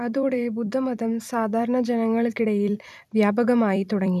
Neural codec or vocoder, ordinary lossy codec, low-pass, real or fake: vocoder, 44.1 kHz, 128 mel bands every 256 samples, BigVGAN v2; none; 14.4 kHz; fake